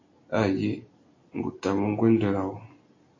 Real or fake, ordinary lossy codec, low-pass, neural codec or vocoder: real; AAC, 32 kbps; 7.2 kHz; none